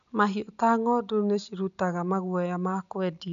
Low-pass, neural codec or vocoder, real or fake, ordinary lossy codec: 7.2 kHz; none; real; none